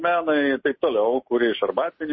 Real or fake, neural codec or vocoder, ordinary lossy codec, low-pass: real; none; MP3, 24 kbps; 7.2 kHz